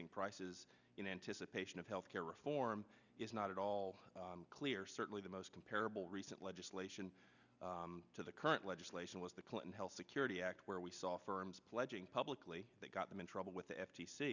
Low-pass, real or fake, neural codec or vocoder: 7.2 kHz; real; none